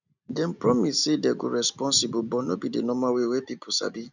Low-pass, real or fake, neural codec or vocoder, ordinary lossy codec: 7.2 kHz; real; none; none